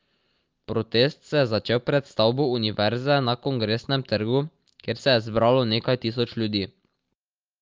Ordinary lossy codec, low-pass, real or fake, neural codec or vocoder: Opus, 24 kbps; 7.2 kHz; real; none